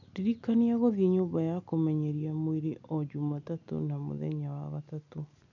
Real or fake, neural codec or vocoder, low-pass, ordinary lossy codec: real; none; 7.2 kHz; none